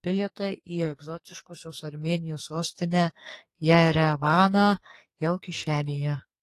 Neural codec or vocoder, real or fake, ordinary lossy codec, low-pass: codec, 44.1 kHz, 2.6 kbps, SNAC; fake; AAC, 48 kbps; 14.4 kHz